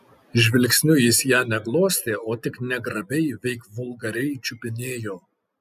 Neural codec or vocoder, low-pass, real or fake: none; 14.4 kHz; real